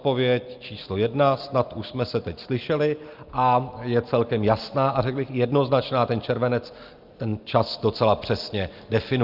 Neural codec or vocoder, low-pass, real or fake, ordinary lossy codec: none; 5.4 kHz; real; Opus, 24 kbps